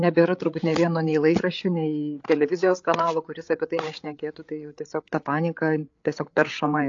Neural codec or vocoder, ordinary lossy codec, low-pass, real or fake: codec, 16 kHz, 8 kbps, FreqCodec, larger model; AAC, 48 kbps; 7.2 kHz; fake